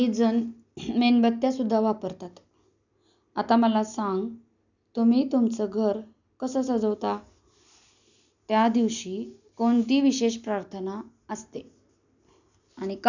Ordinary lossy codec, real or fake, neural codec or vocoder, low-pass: none; real; none; 7.2 kHz